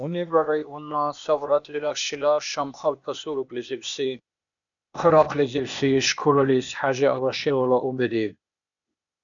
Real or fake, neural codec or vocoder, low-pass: fake; codec, 16 kHz, 0.8 kbps, ZipCodec; 7.2 kHz